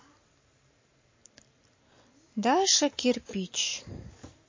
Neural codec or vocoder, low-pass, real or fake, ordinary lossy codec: none; 7.2 kHz; real; MP3, 32 kbps